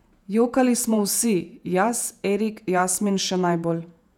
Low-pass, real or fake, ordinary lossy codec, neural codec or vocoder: 19.8 kHz; fake; none; vocoder, 48 kHz, 128 mel bands, Vocos